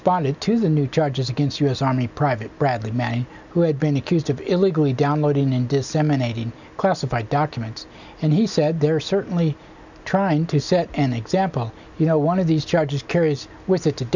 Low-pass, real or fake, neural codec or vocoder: 7.2 kHz; real; none